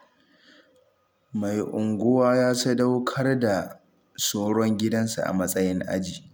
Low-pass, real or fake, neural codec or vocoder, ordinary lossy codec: none; real; none; none